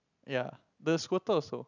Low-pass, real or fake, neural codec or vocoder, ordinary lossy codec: 7.2 kHz; real; none; none